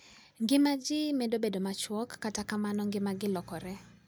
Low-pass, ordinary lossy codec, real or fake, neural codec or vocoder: none; none; real; none